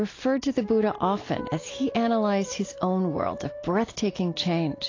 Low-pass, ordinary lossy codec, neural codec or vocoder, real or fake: 7.2 kHz; AAC, 32 kbps; none; real